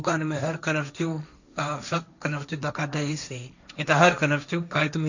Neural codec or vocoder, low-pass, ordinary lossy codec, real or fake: codec, 16 kHz, 1.1 kbps, Voila-Tokenizer; 7.2 kHz; none; fake